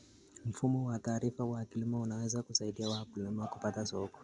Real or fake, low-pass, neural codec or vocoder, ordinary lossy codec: real; 10.8 kHz; none; Opus, 64 kbps